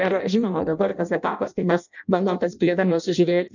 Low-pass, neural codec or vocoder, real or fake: 7.2 kHz; codec, 16 kHz in and 24 kHz out, 0.6 kbps, FireRedTTS-2 codec; fake